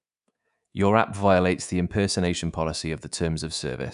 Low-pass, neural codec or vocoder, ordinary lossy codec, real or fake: 10.8 kHz; codec, 24 kHz, 3.1 kbps, DualCodec; none; fake